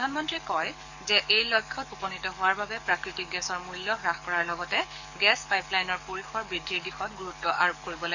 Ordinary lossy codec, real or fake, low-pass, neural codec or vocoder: none; fake; 7.2 kHz; codec, 44.1 kHz, 7.8 kbps, DAC